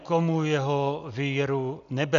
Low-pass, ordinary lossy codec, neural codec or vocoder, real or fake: 7.2 kHz; AAC, 96 kbps; none; real